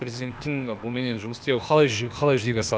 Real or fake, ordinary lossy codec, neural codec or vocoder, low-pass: fake; none; codec, 16 kHz, 0.8 kbps, ZipCodec; none